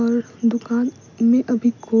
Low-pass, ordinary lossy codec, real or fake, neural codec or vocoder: 7.2 kHz; none; real; none